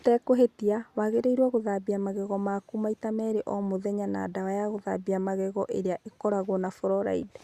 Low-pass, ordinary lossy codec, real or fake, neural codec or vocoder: 14.4 kHz; Opus, 64 kbps; real; none